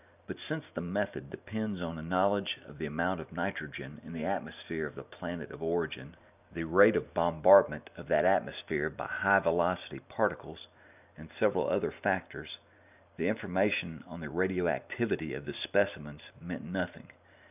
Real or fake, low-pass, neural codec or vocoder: fake; 3.6 kHz; vocoder, 44.1 kHz, 128 mel bands every 512 samples, BigVGAN v2